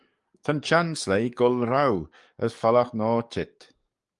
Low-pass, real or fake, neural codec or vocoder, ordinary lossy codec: 10.8 kHz; fake; codec, 44.1 kHz, 7.8 kbps, DAC; Opus, 32 kbps